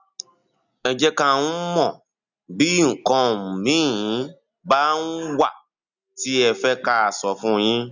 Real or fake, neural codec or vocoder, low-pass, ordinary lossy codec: real; none; 7.2 kHz; none